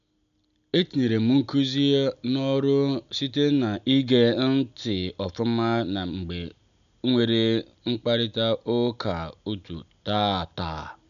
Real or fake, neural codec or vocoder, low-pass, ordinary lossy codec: real; none; 7.2 kHz; none